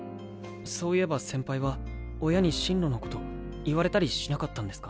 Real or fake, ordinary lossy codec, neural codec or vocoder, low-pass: real; none; none; none